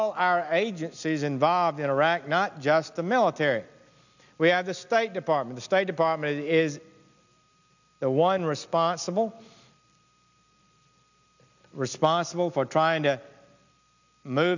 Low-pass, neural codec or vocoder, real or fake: 7.2 kHz; none; real